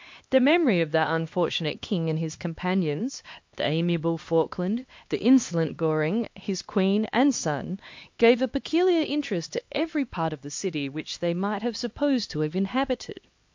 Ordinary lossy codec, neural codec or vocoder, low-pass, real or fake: MP3, 48 kbps; codec, 16 kHz, 2 kbps, X-Codec, HuBERT features, trained on LibriSpeech; 7.2 kHz; fake